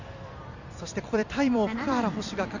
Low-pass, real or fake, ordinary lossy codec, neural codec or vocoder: 7.2 kHz; fake; none; vocoder, 44.1 kHz, 128 mel bands every 256 samples, BigVGAN v2